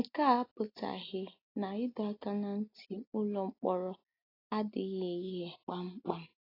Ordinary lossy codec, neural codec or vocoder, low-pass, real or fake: AAC, 24 kbps; none; 5.4 kHz; real